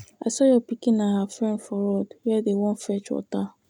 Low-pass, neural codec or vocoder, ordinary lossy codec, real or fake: 19.8 kHz; none; none; real